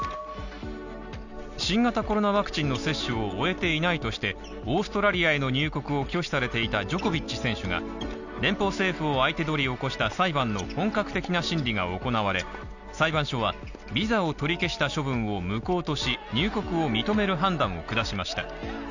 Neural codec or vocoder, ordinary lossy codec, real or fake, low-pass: none; none; real; 7.2 kHz